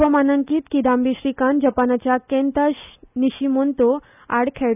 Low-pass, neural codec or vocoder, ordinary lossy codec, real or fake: 3.6 kHz; none; none; real